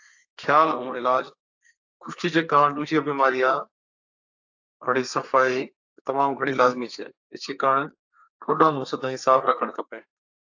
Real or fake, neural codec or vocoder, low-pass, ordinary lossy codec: fake; codec, 32 kHz, 1.9 kbps, SNAC; 7.2 kHz; AAC, 48 kbps